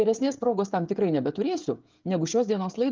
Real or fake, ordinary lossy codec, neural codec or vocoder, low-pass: fake; Opus, 32 kbps; codec, 16 kHz, 16 kbps, FreqCodec, smaller model; 7.2 kHz